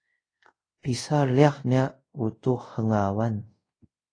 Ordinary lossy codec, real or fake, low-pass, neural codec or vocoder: AAC, 32 kbps; fake; 9.9 kHz; codec, 24 kHz, 0.5 kbps, DualCodec